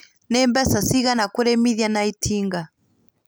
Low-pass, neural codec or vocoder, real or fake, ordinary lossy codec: none; none; real; none